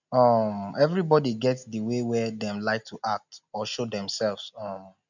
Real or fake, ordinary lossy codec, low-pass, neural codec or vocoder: real; none; 7.2 kHz; none